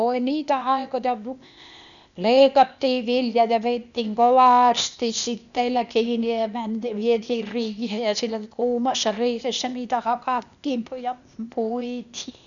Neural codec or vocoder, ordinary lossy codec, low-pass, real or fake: codec, 16 kHz, 0.8 kbps, ZipCodec; none; 7.2 kHz; fake